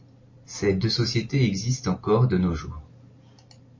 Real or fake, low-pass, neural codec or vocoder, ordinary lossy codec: real; 7.2 kHz; none; MP3, 32 kbps